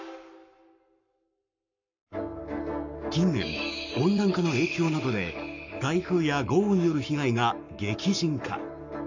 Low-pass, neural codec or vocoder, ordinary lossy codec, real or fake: 7.2 kHz; codec, 44.1 kHz, 7.8 kbps, Pupu-Codec; none; fake